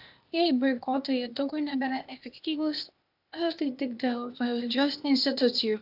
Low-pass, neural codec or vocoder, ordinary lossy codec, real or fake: 5.4 kHz; codec, 16 kHz, 0.8 kbps, ZipCodec; AAC, 48 kbps; fake